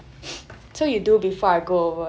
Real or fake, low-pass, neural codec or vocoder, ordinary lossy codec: real; none; none; none